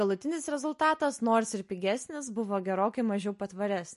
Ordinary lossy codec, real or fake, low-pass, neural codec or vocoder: MP3, 48 kbps; real; 14.4 kHz; none